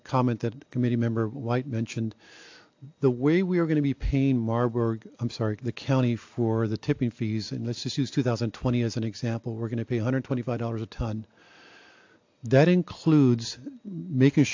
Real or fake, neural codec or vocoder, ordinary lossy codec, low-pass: real; none; AAC, 48 kbps; 7.2 kHz